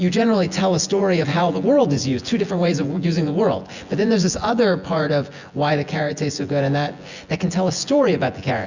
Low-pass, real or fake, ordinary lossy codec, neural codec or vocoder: 7.2 kHz; fake; Opus, 64 kbps; vocoder, 24 kHz, 100 mel bands, Vocos